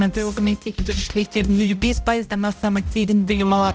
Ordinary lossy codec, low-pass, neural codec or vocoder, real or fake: none; none; codec, 16 kHz, 0.5 kbps, X-Codec, HuBERT features, trained on balanced general audio; fake